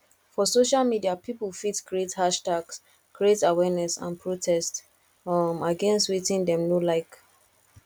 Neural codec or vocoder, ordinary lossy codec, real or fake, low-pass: none; none; real; 19.8 kHz